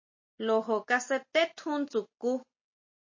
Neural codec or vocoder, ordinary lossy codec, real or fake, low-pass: none; MP3, 32 kbps; real; 7.2 kHz